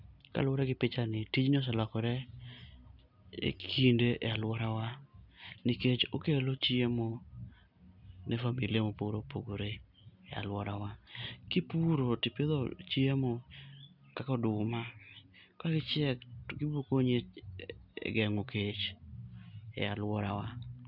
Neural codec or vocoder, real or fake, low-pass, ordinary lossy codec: none; real; 5.4 kHz; none